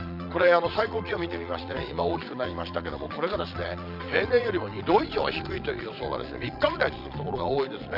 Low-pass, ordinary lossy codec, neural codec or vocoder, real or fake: 5.4 kHz; none; vocoder, 22.05 kHz, 80 mel bands, Vocos; fake